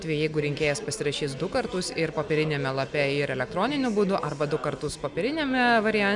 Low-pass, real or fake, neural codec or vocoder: 10.8 kHz; real; none